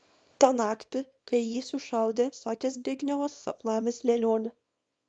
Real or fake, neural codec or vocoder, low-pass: fake; codec, 24 kHz, 0.9 kbps, WavTokenizer, small release; 9.9 kHz